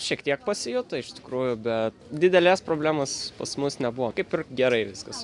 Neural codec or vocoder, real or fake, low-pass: none; real; 10.8 kHz